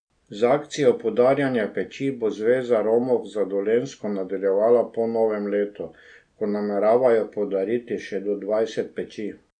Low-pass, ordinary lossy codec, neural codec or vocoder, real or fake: 9.9 kHz; none; none; real